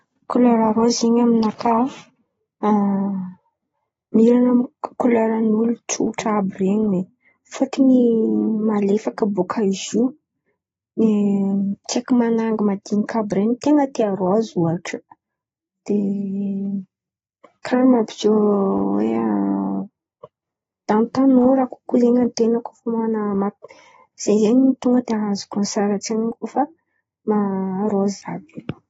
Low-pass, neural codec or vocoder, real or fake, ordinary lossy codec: 19.8 kHz; none; real; AAC, 24 kbps